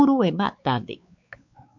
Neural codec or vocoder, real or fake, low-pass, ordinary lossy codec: codec, 16 kHz, 4 kbps, X-Codec, WavLM features, trained on Multilingual LibriSpeech; fake; 7.2 kHz; MP3, 64 kbps